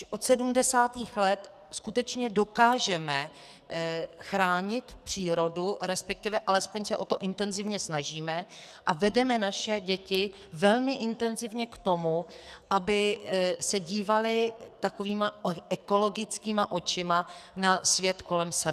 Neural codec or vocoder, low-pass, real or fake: codec, 44.1 kHz, 2.6 kbps, SNAC; 14.4 kHz; fake